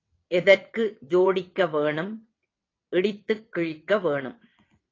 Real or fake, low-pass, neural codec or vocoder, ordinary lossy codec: fake; 7.2 kHz; vocoder, 22.05 kHz, 80 mel bands, WaveNeXt; AAC, 32 kbps